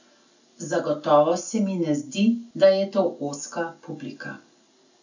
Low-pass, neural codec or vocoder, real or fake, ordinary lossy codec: 7.2 kHz; none; real; none